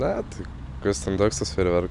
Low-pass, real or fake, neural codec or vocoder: 10.8 kHz; real; none